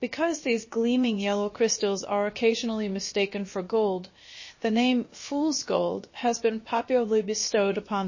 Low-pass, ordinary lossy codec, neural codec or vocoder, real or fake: 7.2 kHz; MP3, 32 kbps; codec, 16 kHz, about 1 kbps, DyCAST, with the encoder's durations; fake